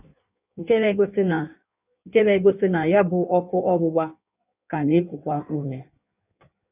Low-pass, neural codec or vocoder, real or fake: 3.6 kHz; codec, 16 kHz in and 24 kHz out, 1.1 kbps, FireRedTTS-2 codec; fake